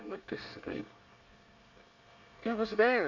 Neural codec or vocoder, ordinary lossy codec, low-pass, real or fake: codec, 24 kHz, 1 kbps, SNAC; AAC, 48 kbps; 7.2 kHz; fake